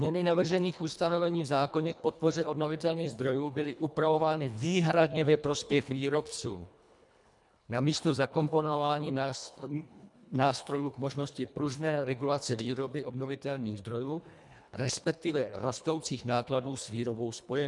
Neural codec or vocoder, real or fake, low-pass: codec, 24 kHz, 1.5 kbps, HILCodec; fake; 10.8 kHz